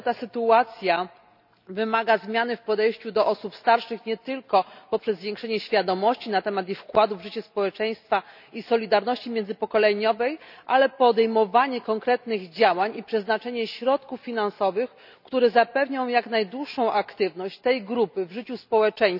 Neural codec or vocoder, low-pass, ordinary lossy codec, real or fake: none; 5.4 kHz; none; real